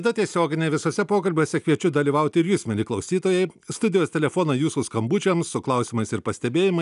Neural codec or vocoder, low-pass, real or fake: none; 10.8 kHz; real